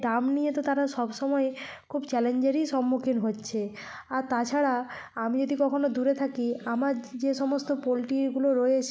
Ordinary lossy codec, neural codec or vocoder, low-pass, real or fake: none; none; none; real